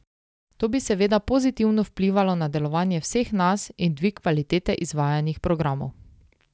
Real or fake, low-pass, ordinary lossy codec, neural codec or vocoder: real; none; none; none